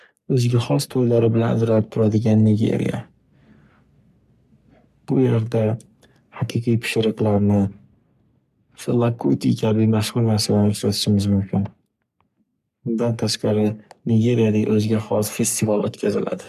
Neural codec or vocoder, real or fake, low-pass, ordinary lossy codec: codec, 44.1 kHz, 3.4 kbps, Pupu-Codec; fake; 14.4 kHz; none